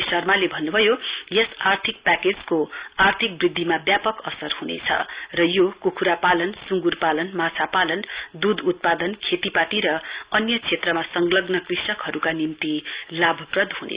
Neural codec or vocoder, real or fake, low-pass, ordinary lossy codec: none; real; 3.6 kHz; Opus, 64 kbps